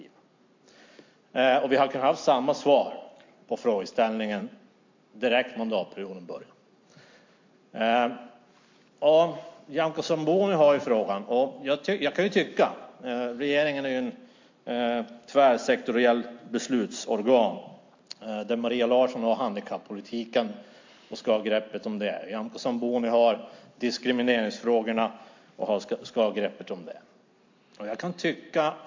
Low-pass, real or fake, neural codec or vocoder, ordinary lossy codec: 7.2 kHz; real; none; MP3, 48 kbps